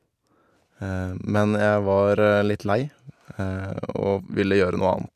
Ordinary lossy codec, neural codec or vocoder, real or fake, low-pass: none; none; real; 14.4 kHz